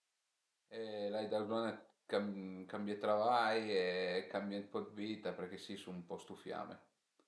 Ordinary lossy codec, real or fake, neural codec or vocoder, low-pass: none; real; none; none